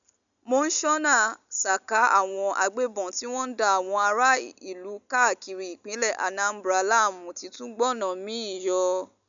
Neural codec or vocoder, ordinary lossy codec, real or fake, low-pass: none; none; real; 7.2 kHz